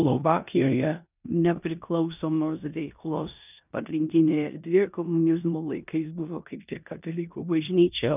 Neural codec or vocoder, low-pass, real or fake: codec, 16 kHz in and 24 kHz out, 0.9 kbps, LongCat-Audio-Codec, four codebook decoder; 3.6 kHz; fake